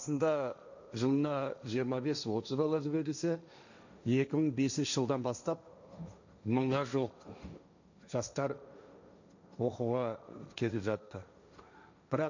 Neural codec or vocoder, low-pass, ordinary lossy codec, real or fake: codec, 16 kHz, 1.1 kbps, Voila-Tokenizer; 7.2 kHz; none; fake